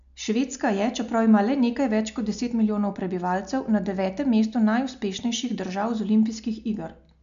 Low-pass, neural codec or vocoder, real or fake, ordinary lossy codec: 7.2 kHz; none; real; none